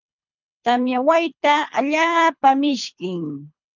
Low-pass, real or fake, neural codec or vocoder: 7.2 kHz; fake; codec, 24 kHz, 3 kbps, HILCodec